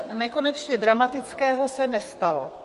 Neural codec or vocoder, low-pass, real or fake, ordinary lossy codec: codec, 32 kHz, 1.9 kbps, SNAC; 14.4 kHz; fake; MP3, 48 kbps